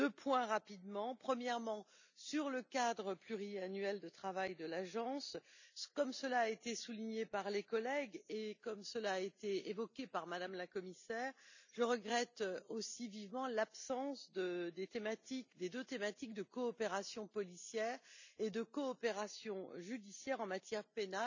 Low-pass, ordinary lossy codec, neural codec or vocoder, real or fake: 7.2 kHz; none; none; real